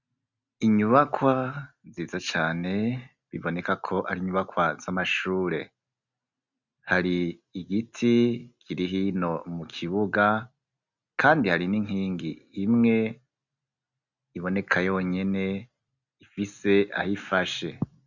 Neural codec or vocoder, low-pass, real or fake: none; 7.2 kHz; real